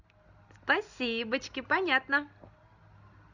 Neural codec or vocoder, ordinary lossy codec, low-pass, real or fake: none; none; 7.2 kHz; real